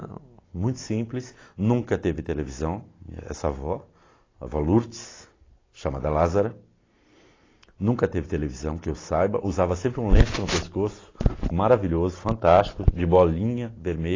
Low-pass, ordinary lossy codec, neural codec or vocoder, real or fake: 7.2 kHz; AAC, 32 kbps; none; real